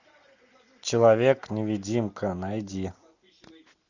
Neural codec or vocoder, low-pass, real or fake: none; 7.2 kHz; real